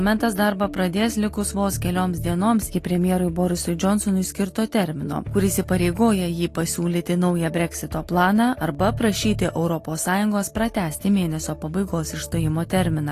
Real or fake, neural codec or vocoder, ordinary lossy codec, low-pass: real; none; AAC, 48 kbps; 14.4 kHz